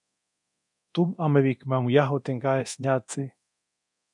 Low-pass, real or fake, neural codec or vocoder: 10.8 kHz; fake; codec, 24 kHz, 0.9 kbps, DualCodec